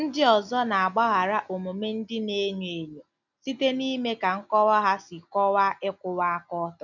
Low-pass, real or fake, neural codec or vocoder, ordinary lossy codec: 7.2 kHz; real; none; none